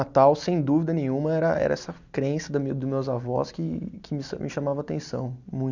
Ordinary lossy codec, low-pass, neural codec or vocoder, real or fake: none; 7.2 kHz; none; real